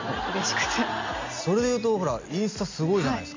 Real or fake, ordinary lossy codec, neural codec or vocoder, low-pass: real; AAC, 48 kbps; none; 7.2 kHz